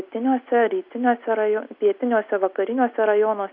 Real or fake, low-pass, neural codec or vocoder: real; 5.4 kHz; none